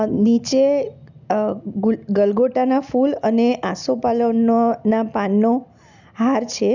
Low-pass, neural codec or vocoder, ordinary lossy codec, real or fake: 7.2 kHz; none; none; real